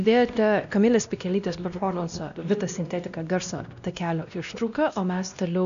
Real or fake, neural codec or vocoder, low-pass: fake; codec, 16 kHz, 1 kbps, X-Codec, WavLM features, trained on Multilingual LibriSpeech; 7.2 kHz